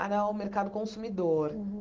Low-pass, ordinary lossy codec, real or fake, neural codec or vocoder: 7.2 kHz; Opus, 16 kbps; real; none